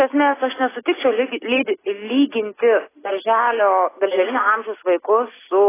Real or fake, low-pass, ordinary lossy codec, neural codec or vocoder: real; 3.6 kHz; AAC, 16 kbps; none